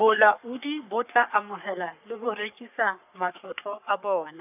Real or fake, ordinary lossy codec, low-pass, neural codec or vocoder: fake; none; 3.6 kHz; codec, 44.1 kHz, 3.4 kbps, Pupu-Codec